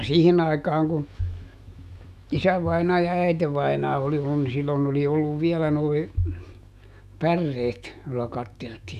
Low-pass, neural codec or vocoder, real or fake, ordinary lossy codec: 14.4 kHz; autoencoder, 48 kHz, 128 numbers a frame, DAC-VAE, trained on Japanese speech; fake; none